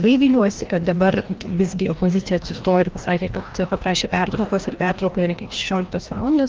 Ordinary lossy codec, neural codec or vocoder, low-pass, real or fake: Opus, 32 kbps; codec, 16 kHz, 1 kbps, FreqCodec, larger model; 7.2 kHz; fake